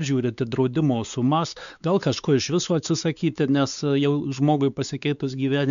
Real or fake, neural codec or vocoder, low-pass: fake; codec, 16 kHz, 4 kbps, X-Codec, WavLM features, trained on Multilingual LibriSpeech; 7.2 kHz